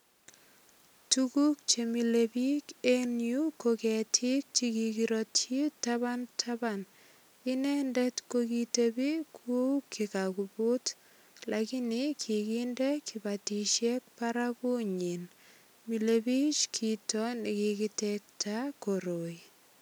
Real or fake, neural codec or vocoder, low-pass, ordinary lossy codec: real; none; none; none